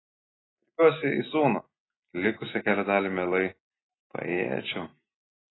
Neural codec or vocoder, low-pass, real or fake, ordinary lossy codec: none; 7.2 kHz; real; AAC, 16 kbps